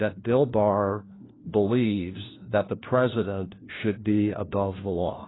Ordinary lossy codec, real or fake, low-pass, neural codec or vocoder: AAC, 16 kbps; fake; 7.2 kHz; codec, 16 kHz, 1 kbps, FunCodec, trained on LibriTTS, 50 frames a second